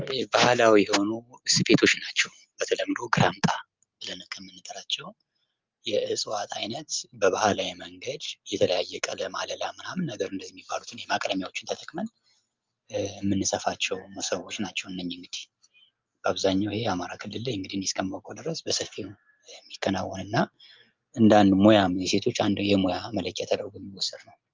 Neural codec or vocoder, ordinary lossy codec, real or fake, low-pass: none; Opus, 32 kbps; real; 7.2 kHz